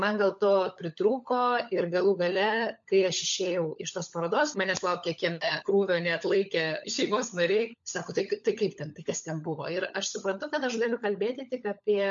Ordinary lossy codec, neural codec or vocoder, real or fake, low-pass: MP3, 48 kbps; codec, 16 kHz, 16 kbps, FunCodec, trained on LibriTTS, 50 frames a second; fake; 7.2 kHz